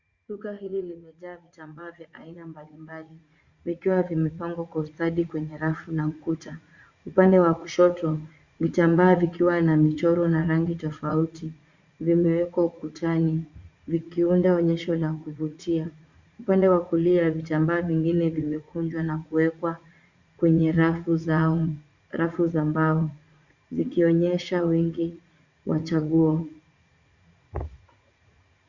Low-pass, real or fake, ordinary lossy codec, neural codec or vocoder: 7.2 kHz; fake; Opus, 64 kbps; vocoder, 44.1 kHz, 80 mel bands, Vocos